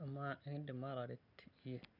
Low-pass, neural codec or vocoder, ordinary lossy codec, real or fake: 5.4 kHz; none; none; real